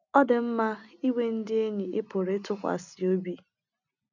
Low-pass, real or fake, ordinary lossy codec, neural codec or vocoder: 7.2 kHz; real; none; none